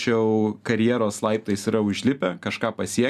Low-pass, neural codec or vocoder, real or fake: 14.4 kHz; none; real